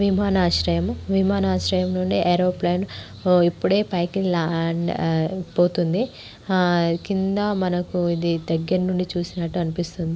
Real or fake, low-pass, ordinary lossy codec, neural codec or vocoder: real; none; none; none